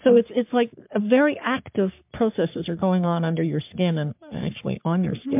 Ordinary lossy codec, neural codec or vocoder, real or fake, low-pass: MP3, 32 kbps; codec, 44.1 kHz, 3.4 kbps, Pupu-Codec; fake; 3.6 kHz